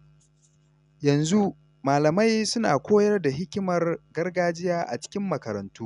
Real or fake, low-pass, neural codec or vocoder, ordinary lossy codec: real; 10.8 kHz; none; none